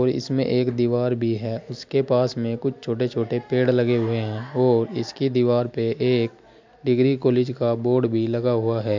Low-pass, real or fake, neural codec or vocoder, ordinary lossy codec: 7.2 kHz; real; none; MP3, 64 kbps